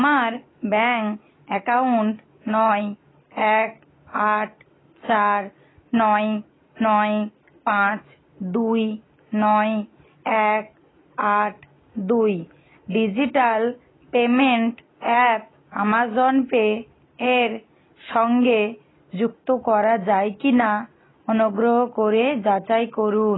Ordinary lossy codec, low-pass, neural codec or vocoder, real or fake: AAC, 16 kbps; 7.2 kHz; none; real